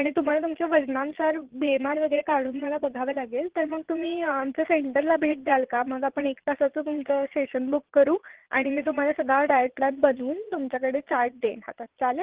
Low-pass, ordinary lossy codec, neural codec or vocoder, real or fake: 3.6 kHz; Opus, 16 kbps; vocoder, 22.05 kHz, 80 mel bands, Vocos; fake